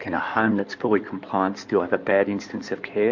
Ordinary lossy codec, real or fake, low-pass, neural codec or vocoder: MP3, 48 kbps; fake; 7.2 kHz; codec, 16 kHz in and 24 kHz out, 2.2 kbps, FireRedTTS-2 codec